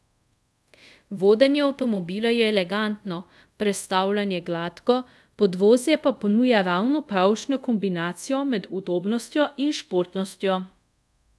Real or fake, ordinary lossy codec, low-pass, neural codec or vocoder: fake; none; none; codec, 24 kHz, 0.5 kbps, DualCodec